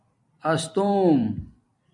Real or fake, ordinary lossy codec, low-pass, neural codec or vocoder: fake; AAC, 64 kbps; 10.8 kHz; vocoder, 44.1 kHz, 128 mel bands every 256 samples, BigVGAN v2